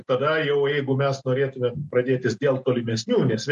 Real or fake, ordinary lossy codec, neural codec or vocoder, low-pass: real; MP3, 48 kbps; none; 14.4 kHz